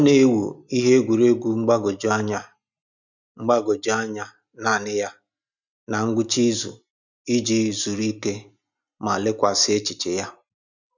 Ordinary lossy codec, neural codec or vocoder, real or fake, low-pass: none; none; real; 7.2 kHz